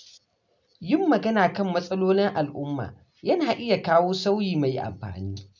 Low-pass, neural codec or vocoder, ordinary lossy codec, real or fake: 7.2 kHz; none; none; real